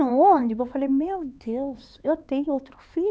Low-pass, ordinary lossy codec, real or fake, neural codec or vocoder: none; none; fake; codec, 16 kHz, 4 kbps, X-Codec, HuBERT features, trained on LibriSpeech